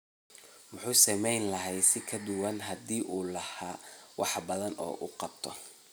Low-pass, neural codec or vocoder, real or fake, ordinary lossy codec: none; none; real; none